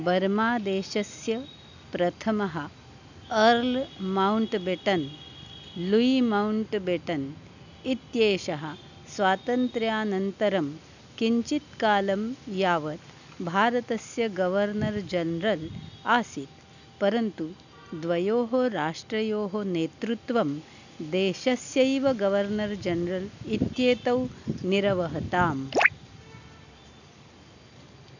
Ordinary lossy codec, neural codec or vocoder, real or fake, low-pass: none; none; real; 7.2 kHz